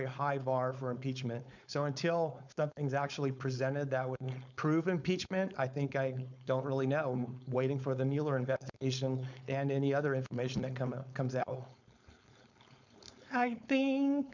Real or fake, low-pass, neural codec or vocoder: fake; 7.2 kHz; codec, 16 kHz, 4.8 kbps, FACodec